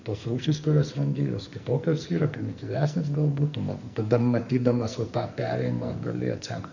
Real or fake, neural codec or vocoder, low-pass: fake; codec, 44.1 kHz, 2.6 kbps, SNAC; 7.2 kHz